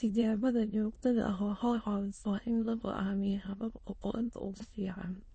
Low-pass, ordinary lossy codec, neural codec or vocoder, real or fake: 9.9 kHz; MP3, 32 kbps; autoencoder, 22.05 kHz, a latent of 192 numbers a frame, VITS, trained on many speakers; fake